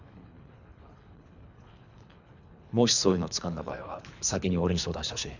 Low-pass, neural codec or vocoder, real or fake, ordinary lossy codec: 7.2 kHz; codec, 24 kHz, 3 kbps, HILCodec; fake; none